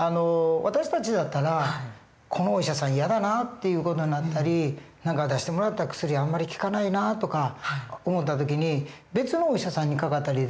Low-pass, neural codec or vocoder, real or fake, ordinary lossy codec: none; none; real; none